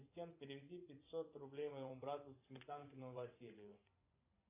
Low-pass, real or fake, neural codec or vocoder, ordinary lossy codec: 3.6 kHz; fake; vocoder, 24 kHz, 100 mel bands, Vocos; AAC, 32 kbps